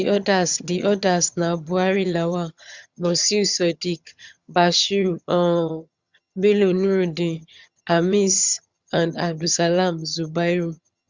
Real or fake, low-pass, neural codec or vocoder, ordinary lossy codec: fake; 7.2 kHz; vocoder, 22.05 kHz, 80 mel bands, HiFi-GAN; Opus, 64 kbps